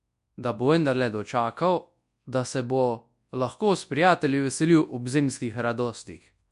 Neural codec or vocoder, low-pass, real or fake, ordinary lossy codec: codec, 24 kHz, 0.9 kbps, WavTokenizer, large speech release; 10.8 kHz; fake; MP3, 64 kbps